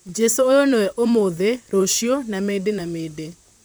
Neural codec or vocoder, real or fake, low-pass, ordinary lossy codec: vocoder, 44.1 kHz, 128 mel bands, Pupu-Vocoder; fake; none; none